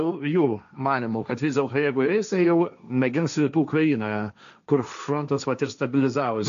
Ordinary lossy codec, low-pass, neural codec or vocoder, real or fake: AAC, 96 kbps; 7.2 kHz; codec, 16 kHz, 1.1 kbps, Voila-Tokenizer; fake